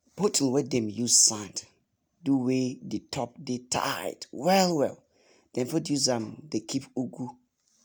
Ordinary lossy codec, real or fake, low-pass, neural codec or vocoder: none; real; none; none